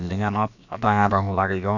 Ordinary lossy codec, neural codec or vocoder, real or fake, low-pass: none; codec, 16 kHz, about 1 kbps, DyCAST, with the encoder's durations; fake; 7.2 kHz